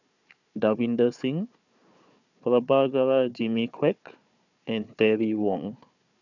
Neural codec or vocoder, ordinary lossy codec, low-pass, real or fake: codec, 16 kHz, 4 kbps, FunCodec, trained on Chinese and English, 50 frames a second; none; 7.2 kHz; fake